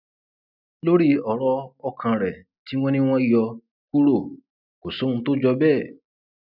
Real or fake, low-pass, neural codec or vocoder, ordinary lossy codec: real; 5.4 kHz; none; none